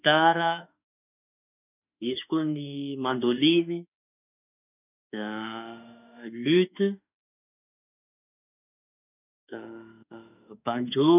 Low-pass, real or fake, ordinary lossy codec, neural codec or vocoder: 3.6 kHz; fake; none; autoencoder, 48 kHz, 32 numbers a frame, DAC-VAE, trained on Japanese speech